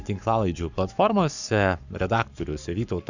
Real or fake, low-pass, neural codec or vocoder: fake; 7.2 kHz; codec, 44.1 kHz, 7.8 kbps, Pupu-Codec